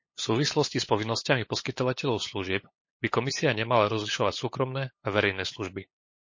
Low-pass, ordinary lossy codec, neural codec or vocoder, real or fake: 7.2 kHz; MP3, 32 kbps; codec, 16 kHz, 8 kbps, FunCodec, trained on LibriTTS, 25 frames a second; fake